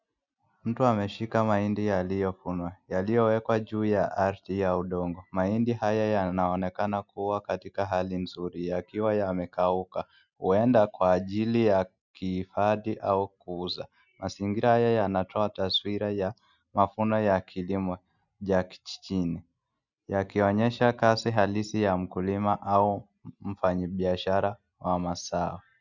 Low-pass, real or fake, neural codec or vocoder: 7.2 kHz; real; none